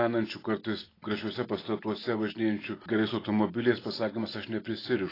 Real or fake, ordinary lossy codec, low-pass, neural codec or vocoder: real; AAC, 24 kbps; 5.4 kHz; none